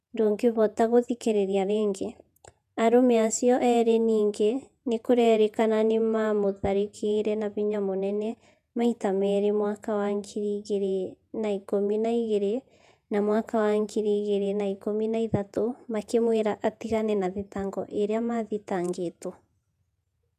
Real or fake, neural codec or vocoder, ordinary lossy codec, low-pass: fake; vocoder, 48 kHz, 128 mel bands, Vocos; none; 14.4 kHz